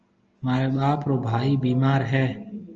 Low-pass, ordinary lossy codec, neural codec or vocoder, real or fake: 7.2 kHz; Opus, 24 kbps; none; real